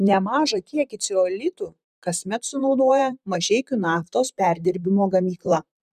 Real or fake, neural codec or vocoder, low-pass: fake; vocoder, 44.1 kHz, 128 mel bands, Pupu-Vocoder; 14.4 kHz